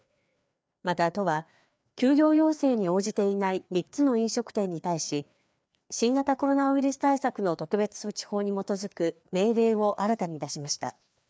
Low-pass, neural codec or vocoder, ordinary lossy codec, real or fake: none; codec, 16 kHz, 2 kbps, FreqCodec, larger model; none; fake